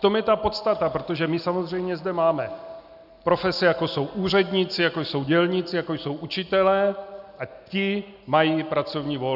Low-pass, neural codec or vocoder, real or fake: 5.4 kHz; none; real